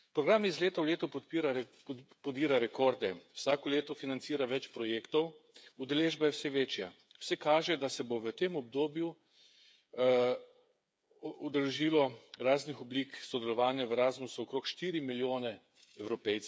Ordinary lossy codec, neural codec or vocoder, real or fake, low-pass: none; codec, 16 kHz, 8 kbps, FreqCodec, smaller model; fake; none